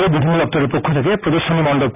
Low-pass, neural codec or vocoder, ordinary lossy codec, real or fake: 3.6 kHz; none; none; real